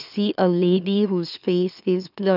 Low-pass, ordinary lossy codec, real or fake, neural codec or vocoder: 5.4 kHz; none; fake; autoencoder, 44.1 kHz, a latent of 192 numbers a frame, MeloTTS